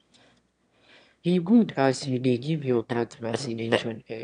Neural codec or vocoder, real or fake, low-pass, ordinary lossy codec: autoencoder, 22.05 kHz, a latent of 192 numbers a frame, VITS, trained on one speaker; fake; 9.9 kHz; MP3, 64 kbps